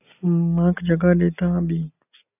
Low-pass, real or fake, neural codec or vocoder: 3.6 kHz; real; none